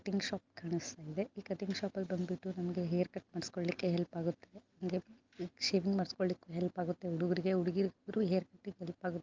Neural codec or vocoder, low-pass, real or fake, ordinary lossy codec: none; 7.2 kHz; real; Opus, 24 kbps